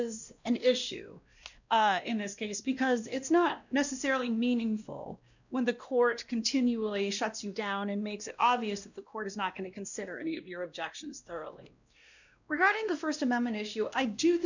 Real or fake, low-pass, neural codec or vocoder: fake; 7.2 kHz; codec, 16 kHz, 1 kbps, X-Codec, WavLM features, trained on Multilingual LibriSpeech